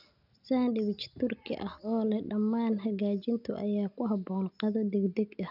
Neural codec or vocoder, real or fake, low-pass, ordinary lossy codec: none; real; 5.4 kHz; none